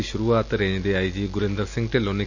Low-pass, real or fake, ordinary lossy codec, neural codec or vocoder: 7.2 kHz; real; none; none